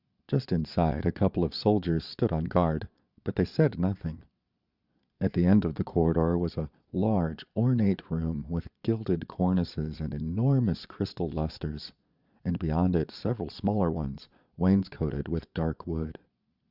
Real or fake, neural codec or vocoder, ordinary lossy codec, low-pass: fake; vocoder, 22.05 kHz, 80 mel bands, Vocos; Opus, 64 kbps; 5.4 kHz